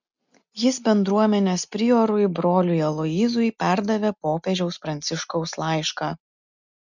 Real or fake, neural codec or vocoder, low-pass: real; none; 7.2 kHz